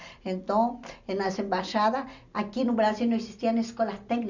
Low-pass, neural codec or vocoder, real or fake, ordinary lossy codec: 7.2 kHz; none; real; none